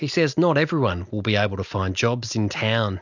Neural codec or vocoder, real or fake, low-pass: none; real; 7.2 kHz